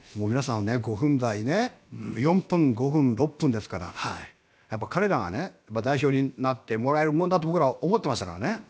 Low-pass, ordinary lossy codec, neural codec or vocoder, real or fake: none; none; codec, 16 kHz, about 1 kbps, DyCAST, with the encoder's durations; fake